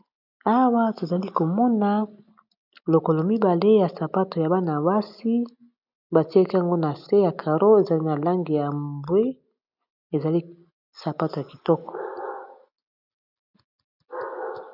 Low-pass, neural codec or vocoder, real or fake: 5.4 kHz; none; real